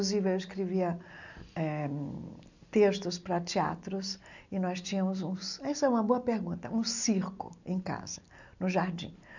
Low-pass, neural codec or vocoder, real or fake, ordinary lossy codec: 7.2 kHz; none; real; none